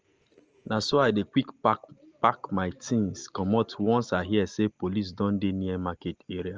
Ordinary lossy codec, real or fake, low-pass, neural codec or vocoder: Opus, 24 kbps; real; 7.2 kHz; none